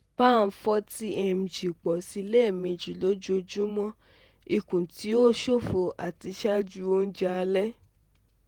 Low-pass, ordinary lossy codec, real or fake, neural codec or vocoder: 19.8 kHz; Opus, 24 kbps; fake; vocoder, 48 kHz, 128 mel bands, Vocos